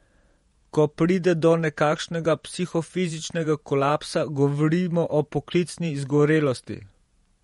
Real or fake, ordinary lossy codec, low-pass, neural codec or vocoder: fake; MP3, 48 kbps; 19.8 kHz; vocoder, 48 kHz, 128 mel bands, Vocos